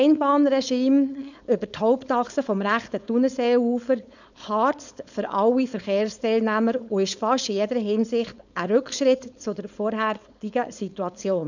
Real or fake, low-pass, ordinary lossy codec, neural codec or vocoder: fake; 7.2 kHz; none; codec, 16 kHz, 4.8 kbps, FACodec